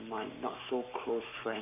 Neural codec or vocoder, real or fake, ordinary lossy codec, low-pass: codec, 44.1 kHz, 7.8 kbps, Pupu-Codec; fake; MP3, 32 kbps; 3.6 kHz